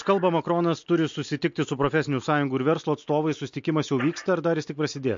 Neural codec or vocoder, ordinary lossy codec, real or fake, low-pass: none; MP3, 48 kbps; real; 7.2 kHz